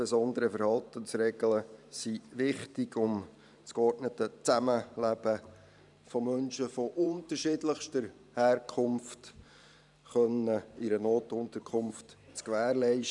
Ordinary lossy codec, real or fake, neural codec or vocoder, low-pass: none; fake; vocoder, 44.1 kHz, 128 mel bands every 512 samples, BigVGAN v2; 10.8 kHz